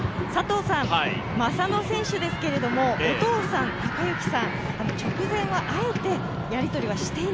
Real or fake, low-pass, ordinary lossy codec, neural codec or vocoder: real; none; none; none